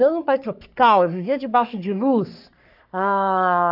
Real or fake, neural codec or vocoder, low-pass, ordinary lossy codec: fake; codec, 44.1 kHz, 3.4 kbps, Pupu-Codec; 5.4 kHz; AAC, 48 kbps